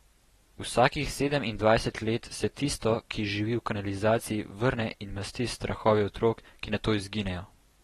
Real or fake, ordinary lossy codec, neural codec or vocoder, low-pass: real; AAC, 32 kbps; none; 14.4 kHz